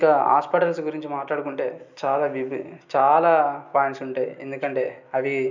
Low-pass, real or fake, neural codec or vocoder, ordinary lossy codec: 7.2 kHz; real; none; none